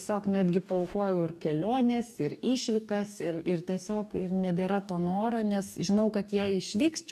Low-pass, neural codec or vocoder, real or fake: 14.4 kHz; codec, 44.1 kHz, 2.6 kbps, DAC; fake